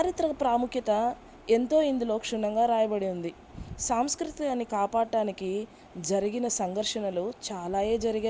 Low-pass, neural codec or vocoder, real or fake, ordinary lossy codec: none; none; real; none